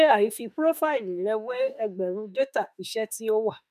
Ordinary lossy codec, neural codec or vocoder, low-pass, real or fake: none; autoencoder, 48 kHz, 32 numbers a frame, DAC-VAE, trained on Japanese speech; 14.4 kHz; fake